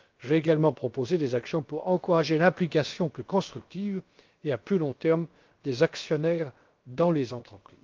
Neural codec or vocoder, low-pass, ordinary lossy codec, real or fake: codec, 16 kHz, about 1 kbps, DyCAST, with the encoder's durations; 7.2 kHz; Opus, 32 kbps; fake